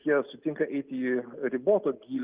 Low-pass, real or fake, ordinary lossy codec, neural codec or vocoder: 3.6 kHz; real; Opus, 32 kbps; none